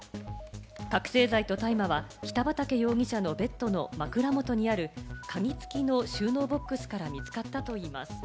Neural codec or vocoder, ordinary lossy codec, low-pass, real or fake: none; none; none; real